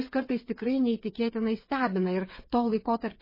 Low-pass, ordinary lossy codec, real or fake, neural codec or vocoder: 5.4 kHz; MP3, 24 kbps; fake; codec, 16 kHz, 8 kbps, FreqCodec, smaller model